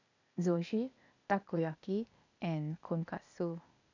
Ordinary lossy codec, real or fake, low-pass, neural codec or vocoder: none; fake; 7.2 kHz; codec, 16 kHz, 0.8 kbps, ZipCodec